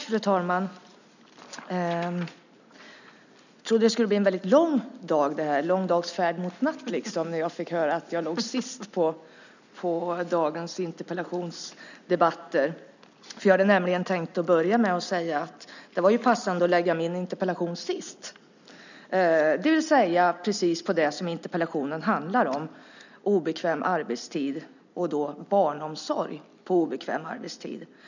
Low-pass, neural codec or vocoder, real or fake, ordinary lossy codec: 7.2 kHz; none; real; none